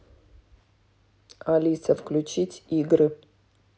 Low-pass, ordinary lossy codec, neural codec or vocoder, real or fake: none; none; none; real